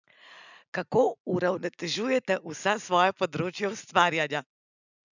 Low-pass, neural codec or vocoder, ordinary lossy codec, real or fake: 7.2 kHz; none; none; real